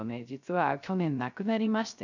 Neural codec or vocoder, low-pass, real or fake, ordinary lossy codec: codec, 16 kHz, 0.3 kbps, FocalCodec; 7.2 kHz; fake; none